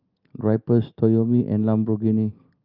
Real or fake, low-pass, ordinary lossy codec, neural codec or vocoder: real; 5.4 kHz; Opus, 24 kbps; none